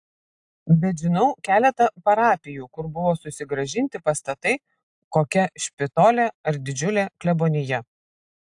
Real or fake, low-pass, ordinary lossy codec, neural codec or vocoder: real; 10.8 kHz; AAC, 64 kbps; none